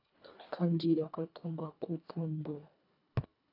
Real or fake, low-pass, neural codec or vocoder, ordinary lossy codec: fake; 5.4 kHz; codec, 24 kHz, 1.5 kbps, HILCodec; AAC, 32 kbps